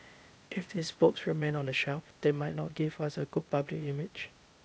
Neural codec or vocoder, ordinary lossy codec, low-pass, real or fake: codec, 16 kHz, 0.8 kbps, ZipCodec; none; none; fake